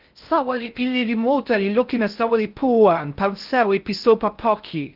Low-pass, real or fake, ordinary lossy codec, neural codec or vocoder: 5.4 kHz; fake; Opus, 24 kbps; codec, 16 kHz in and 24 kHz out, 0.6 kbps, FocalCodec, streaming, 2048 codes